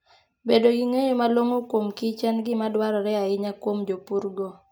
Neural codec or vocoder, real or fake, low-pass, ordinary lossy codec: none; real; none; none